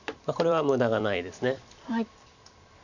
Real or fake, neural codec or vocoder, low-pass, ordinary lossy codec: fake; vocoder, 44.1 kHz, 128 mel bands every 256 samples, BigVGAN v2; 7.2 kHz; none